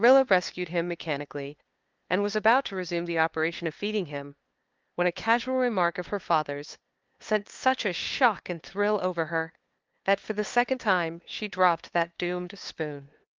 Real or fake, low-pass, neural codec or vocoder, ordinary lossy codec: fake; 7.2 kHz; codec, 16 kHz, 2 kbps, FunCodec, trained on LibriTTS, 25 frames a second; Opus, 32 kbps